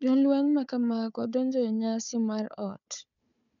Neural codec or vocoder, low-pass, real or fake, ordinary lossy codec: codec, 16 kHz, 4 kbps, FunCodec, trained on Chinese and English, 50 frames a second; 7.2 kHz; fake; none